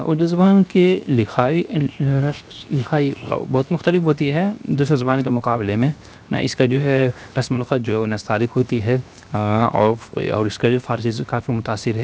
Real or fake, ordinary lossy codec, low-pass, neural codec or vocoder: fake; none; none; codec, 16 kHz, 0.7 kbps, FocalCodec